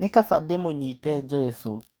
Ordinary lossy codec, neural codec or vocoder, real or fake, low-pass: none; codec, 44.1 kHz, 2.6 kbps, DAC; fake; none